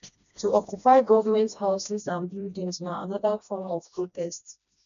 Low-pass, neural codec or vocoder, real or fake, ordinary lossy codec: 7.2 kHz; codec, 16 kHz, 1 kbps, FreqCodec, smaller model; fake; none